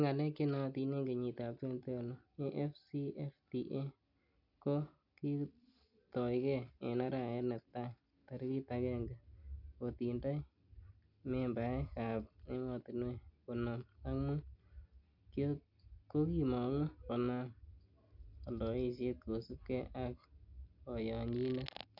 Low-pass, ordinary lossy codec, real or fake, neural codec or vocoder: 5.4 kHz; none; real; none